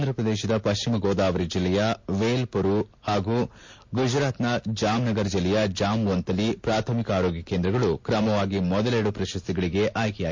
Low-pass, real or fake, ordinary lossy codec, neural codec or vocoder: 7.2 kHz; real; MP3, 48 kbps; none